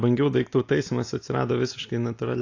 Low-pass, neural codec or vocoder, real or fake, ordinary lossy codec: 7.2 kHz; none; real; AAC, 48 kbps